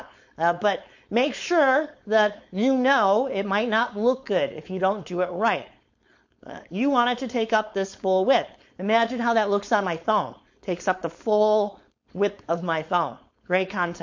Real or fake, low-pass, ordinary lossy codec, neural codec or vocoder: fake; 7.2 kHz; MP3, 48 kbps; codec, 16 kHz, 4.8 kbps, FACodec